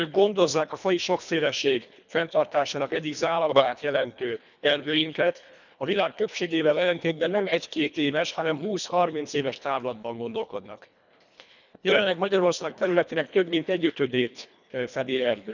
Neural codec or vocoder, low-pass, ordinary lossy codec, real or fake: codec, 24 kHz, 1.5 kbps, HILCodec; 7.2 kHz; none; fake